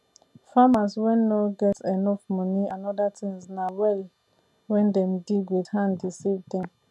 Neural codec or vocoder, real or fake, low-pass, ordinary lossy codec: none; real; none; none